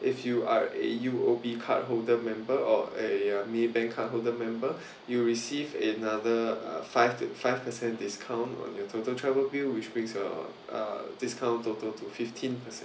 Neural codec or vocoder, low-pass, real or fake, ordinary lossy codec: none; none; real; none